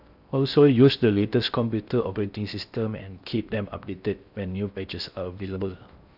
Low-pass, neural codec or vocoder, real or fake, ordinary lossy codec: 5.4 kHz; codec, 16 kHz in and 24 kHz out, 0.6 kbps, FocalCodec, streaming, 4096 codes; fake; none